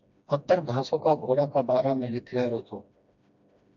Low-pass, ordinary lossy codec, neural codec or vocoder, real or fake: 7.2 kHz; AAC, 64 kbps; codec, 16 kHz, 1 kbps, FreqCodec, smaller model; fake